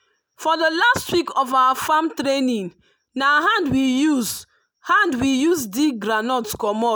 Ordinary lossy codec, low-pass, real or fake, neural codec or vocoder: none; none; real; none